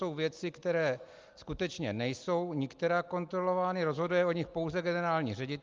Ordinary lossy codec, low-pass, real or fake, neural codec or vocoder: Opus, 32 kbps; 7.2 kHz; real; none